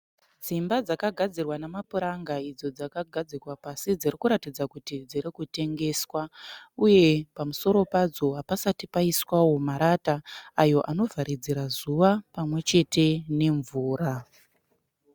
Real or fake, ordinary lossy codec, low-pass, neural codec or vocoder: real; Opus, 64 kbps; 19.8 kHz; none